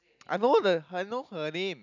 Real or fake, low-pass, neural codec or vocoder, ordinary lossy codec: real; 7.2 kHz; none; none